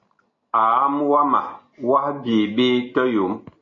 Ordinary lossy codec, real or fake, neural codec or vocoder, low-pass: AAC, 32 kbps; real; none; 7.2 kHz